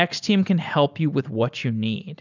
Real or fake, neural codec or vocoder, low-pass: real; none; 7.2 kHz